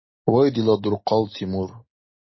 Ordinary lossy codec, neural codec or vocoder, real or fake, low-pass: MP3, 24 kbps; none; real; 7.2 kHz